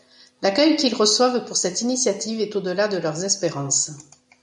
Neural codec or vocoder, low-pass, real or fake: none; 10.8 kHz; real